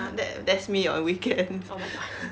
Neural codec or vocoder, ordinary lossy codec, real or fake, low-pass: none; none; real; none